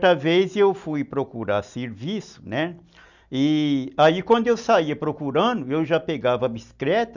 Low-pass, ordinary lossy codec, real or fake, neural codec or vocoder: 7.2 kHz; none; real; none